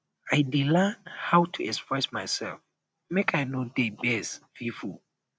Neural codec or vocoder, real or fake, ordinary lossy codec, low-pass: none; real; none; none